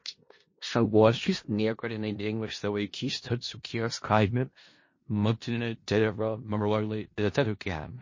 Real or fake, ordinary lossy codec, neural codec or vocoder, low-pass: fake; MP3, 32 kbps; codec, 16 kHz in and 24 kHz out, 0.4 kbps, LongCat-Audio-Codec, four codebook decoder; 7.2 kHz